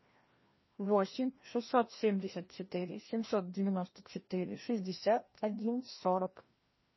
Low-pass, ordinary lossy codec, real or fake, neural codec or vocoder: 7.2 kHz; MP3, 24 kbps; fake; codec, 16 kHz, 1 kbps, FreqCodec, larger model